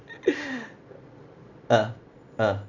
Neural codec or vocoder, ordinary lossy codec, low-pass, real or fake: none; none; 7.2 kHz; real